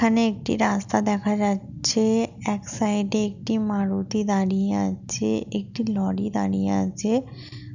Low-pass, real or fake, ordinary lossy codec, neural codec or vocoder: 7.2 kHz; real; none; none